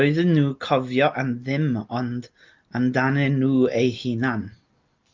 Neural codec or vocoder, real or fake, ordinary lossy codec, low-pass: none; real; Opus, 24 kbps; 7.2 kHz